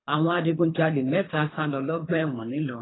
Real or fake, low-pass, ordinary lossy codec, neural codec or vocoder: fake; 7.2 kHz; AAC, 16 kbps; codec, 24 kHz, 3 kbps, HILCodec